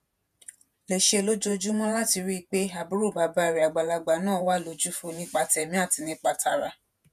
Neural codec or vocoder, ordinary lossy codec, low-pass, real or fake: vocoder, 48 kHz, 128 mel bands, Vocos; none; 14.4 kHz; fake